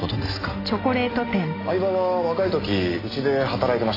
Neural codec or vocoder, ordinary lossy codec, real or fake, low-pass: none; AAC, 24 kbps; real; 5.4 kHz